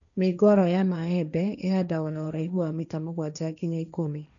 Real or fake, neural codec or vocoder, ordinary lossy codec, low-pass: fake; codec, 16 kHz, 1.1 kbps, Voila-Tokenizer; none; 7.2 kHz